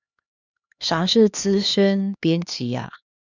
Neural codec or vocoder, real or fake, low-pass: codec, 16 kHz, 2 kbps, X-Codec, HuBERT features, trained on LibriSpeech; fake; 7.2 kHz